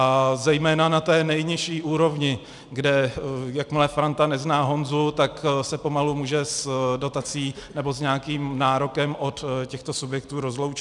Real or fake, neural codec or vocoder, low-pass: real; none; 10.8 kHz